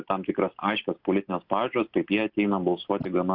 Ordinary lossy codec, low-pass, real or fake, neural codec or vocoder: Opus, 64 kbps; 5.4 kHz; real; none